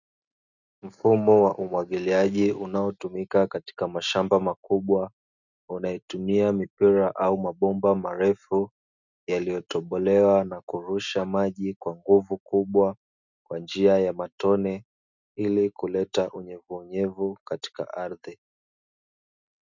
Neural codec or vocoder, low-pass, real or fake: none; 7.2 kHz; real